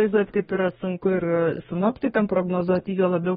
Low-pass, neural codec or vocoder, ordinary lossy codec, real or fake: 14.4 kHz; codec, 32 kHz, 1.9 kbps, SNAC; AAC, 16 kbps; fake